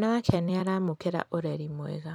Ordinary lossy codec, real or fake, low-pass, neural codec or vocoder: none; fake; 19.8 kHz; vocoder, 44.1 kHz, 128 mel bands every 256 samples, BigVGAN v2